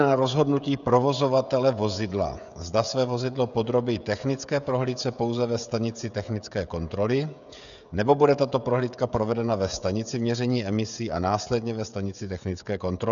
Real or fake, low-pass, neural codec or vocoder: fake; 7.2 kHz; codec, 16 kHz, 16 kbps, FreqCodec, smaller model